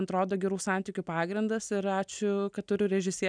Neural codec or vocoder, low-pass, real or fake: none; 9.9 kHz; real